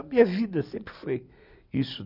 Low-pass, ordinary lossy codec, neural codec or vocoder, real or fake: 5.4 kHz; none; none; real